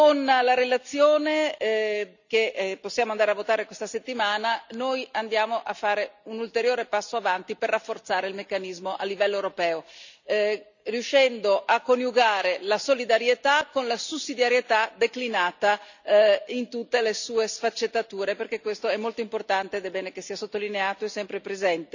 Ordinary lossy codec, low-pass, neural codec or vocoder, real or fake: none; 7.2 kHz; none; real